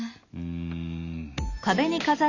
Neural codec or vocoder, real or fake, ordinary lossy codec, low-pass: none; real; none; 7.2 kHz